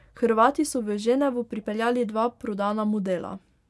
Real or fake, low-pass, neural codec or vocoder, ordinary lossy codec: real; none; none; none